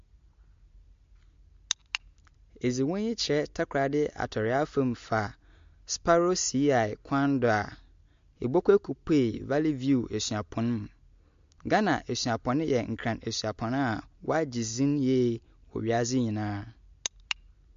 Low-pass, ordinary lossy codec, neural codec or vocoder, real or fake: 7.2 kHz; MP3, 48 kbps; none; real